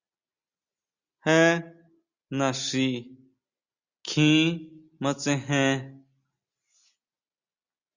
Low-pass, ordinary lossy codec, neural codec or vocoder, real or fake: 7.2 kHz; Opus, 64 kbps; none; real